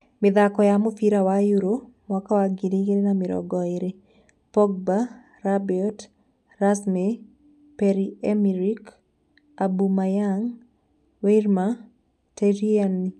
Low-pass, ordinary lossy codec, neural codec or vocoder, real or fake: none; none; none; real